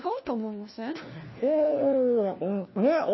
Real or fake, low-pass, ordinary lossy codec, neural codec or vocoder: fake; 7.2 kHz; MP3, 24 kbps; codec, 16 kHz, 1 kbps, FunCodec, trained on LibriTTS, 50 frames a second